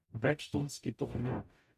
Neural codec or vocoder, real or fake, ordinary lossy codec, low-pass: codec, 44.1 kHz, 0.9 kbps, DAC; fake; none; 14.4 kHz